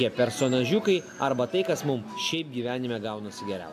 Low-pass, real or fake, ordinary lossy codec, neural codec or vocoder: 14.4 kHz; real; AAC, 96 kbps; none